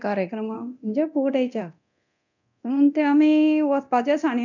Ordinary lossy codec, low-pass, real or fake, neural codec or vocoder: none; 7.2 kHz; fake; codec, 24 kHz, 0.9 kbps, DualCodec